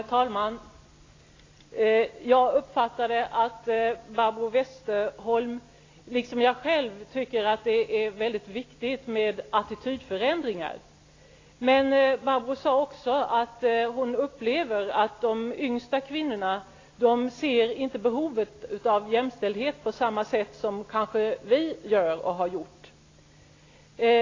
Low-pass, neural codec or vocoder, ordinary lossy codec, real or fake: 7.2 kHz; none; AAC, 32 kbps; real